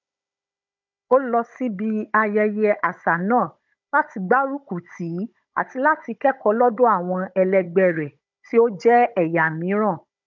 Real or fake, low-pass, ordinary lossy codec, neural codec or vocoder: fake; 7.2 kHz; none; codec, 16 kHz, 16 kbps, FunCodec, trained on Chinese and English, 50 frames a second